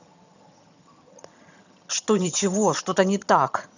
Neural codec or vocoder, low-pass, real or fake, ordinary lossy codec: vocoder, 22.05 kHz, 80 mel bands, HiFi-GAN; 7.2 kHz; fake; none